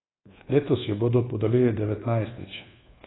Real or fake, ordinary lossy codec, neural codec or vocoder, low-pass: fake; AAC, 16 kbps; codec, 24 kHz, 1.2 kbps, DualCodec; 7.2 kHz